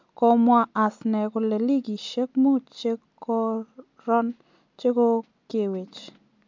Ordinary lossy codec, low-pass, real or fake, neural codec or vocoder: MP3, 64 kbps; 7.2 kHz; real; none